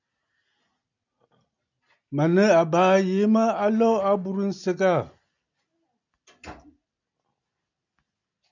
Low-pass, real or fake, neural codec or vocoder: 7.2 kHz; real; none